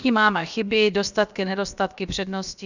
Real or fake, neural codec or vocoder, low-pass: fake; codec, 16 kHz, about 1 kbps, DyCAST, with the encoder's durations; 7.2 kHz